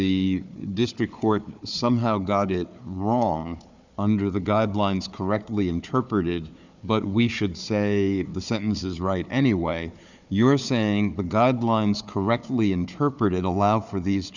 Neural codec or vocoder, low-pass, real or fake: codec, 16 kHz, 4 kbps, FunCodec, trained on Chinese and English, 50 frames a second; 7.2 kHz; fake